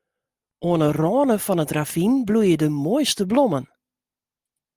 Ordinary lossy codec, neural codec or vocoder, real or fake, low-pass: Opus, 32 kbps; none; real; 14.4 kHz